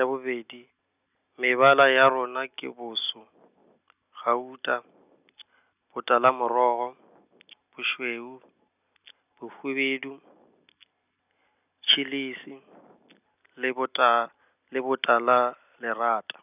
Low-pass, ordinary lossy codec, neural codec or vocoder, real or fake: 3.6 kHz; none; none; real